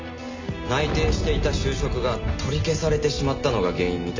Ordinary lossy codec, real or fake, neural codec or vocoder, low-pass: MP3, 48 kbps; real; none; 7.2 kHz